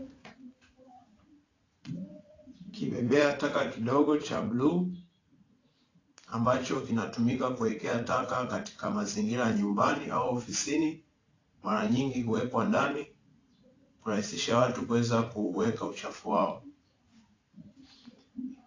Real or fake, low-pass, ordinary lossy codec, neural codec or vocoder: fake; 7.2 kHz; AAC, 32 kbps; vocoder, 44.1 kHz, 80 mel bands, Vocos